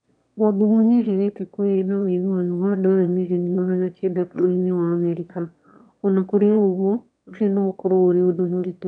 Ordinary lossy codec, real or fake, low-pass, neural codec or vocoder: none; fake; 9.9 kHz; autoencoder, 22.05 kHz, a latent of 192 numbers a frame, VITS, trained on one speaker